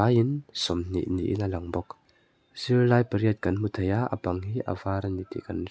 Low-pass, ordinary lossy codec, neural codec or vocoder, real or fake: none; none; none; real